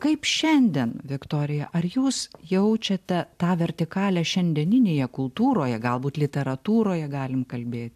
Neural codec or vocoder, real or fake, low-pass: none; real; 14.4 kHz